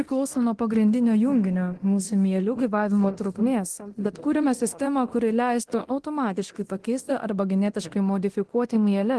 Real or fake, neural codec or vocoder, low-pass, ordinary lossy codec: fake; codec, 24 kHz, 0.9 kbps, DualCodec; 10.8 kHz; Opus, 16 kbps